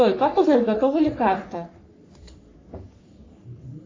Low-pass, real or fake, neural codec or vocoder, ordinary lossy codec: 7.2 kHz; fake; codec, 44.1 kHz, 3.4 kbps, Pupu-Codec; AAC, 32 kbps